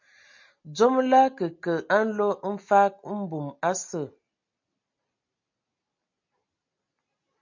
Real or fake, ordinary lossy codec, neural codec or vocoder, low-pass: real; MP3, 48 kbps; none; 7.2 kHz